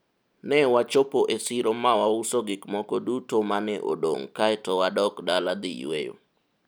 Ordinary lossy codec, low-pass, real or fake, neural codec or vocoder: none; none; real; none